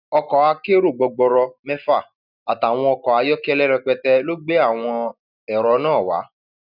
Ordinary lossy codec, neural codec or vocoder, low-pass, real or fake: none; none; 5.4 kHz; real